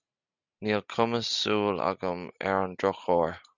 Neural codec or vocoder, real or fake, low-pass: none; real; 7.2 kHz